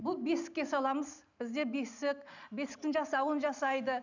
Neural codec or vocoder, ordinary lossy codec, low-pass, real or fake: none; none; 7.2 kHz; real